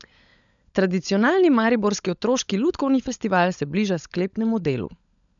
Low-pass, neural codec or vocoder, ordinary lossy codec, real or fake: 7.2 kHz; codec, 16 kHz, 16 kbps, FunCodec, trained on LibriTTS, 50 frames a second; none; fake